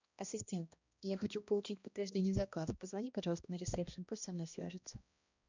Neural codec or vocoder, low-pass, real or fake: codec, 16 kHz, 1 kbps, X-Codec, HuBERT features, trained on balanced general audio; 7.2 kHz; fake